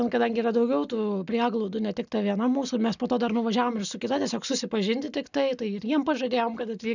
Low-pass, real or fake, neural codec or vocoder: 7.2 kHz; real; none